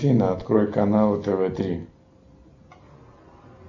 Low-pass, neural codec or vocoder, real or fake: 7.2 kHz; none; real